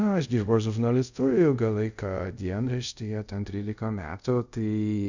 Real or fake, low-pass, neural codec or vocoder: fake; 7.2 kHz; codec, 24 kHz, 0.5 kbps, DualCodec